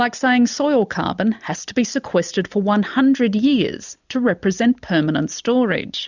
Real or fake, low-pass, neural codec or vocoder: real; 7.2 kHz; none